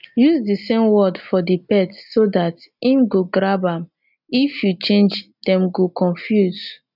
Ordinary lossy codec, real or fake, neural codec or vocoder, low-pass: none; real; none; 5.4 kHz